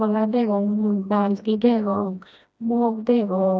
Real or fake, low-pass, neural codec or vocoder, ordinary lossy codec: fake; none; codec, 16 kHz, 1 kbps, FreqCodec, smaller model; none